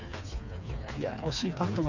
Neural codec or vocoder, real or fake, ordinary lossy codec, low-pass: codec, 16 kHz, 4 kbps, FreqCodec, smaller model; fake; none; 7.2 kHz